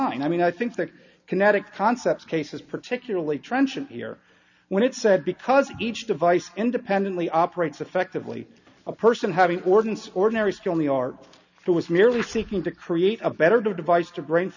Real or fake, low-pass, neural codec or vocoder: real; 7.2 kHz; none